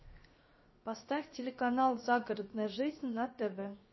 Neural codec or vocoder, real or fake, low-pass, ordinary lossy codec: codec, 16 kHz, 0.7 kbps, FocalCodec; fake; 7.2 kHz; MP3, 24 kbps